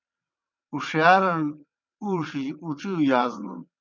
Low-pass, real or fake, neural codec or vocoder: 7.2 kHz; fake; vocoder, 22.05 kHz, 80 mel bands, WaveNeXt